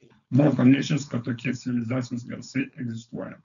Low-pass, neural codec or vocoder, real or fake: 7.2 kHz; codec, 16 kHz, 4.8 kbps, FACodec; fake